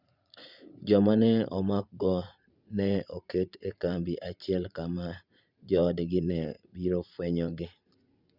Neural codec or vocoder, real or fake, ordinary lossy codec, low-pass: vocoder, 44.1 kHz, 80 mel bands, Vocos; fake; Opus, 64 kbps; 5.4 kHz